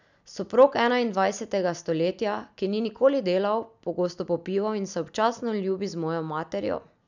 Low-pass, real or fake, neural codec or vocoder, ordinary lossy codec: 7.2 kHz; real; none; none